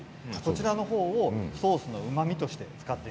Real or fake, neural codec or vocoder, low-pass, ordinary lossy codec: real; none; none; none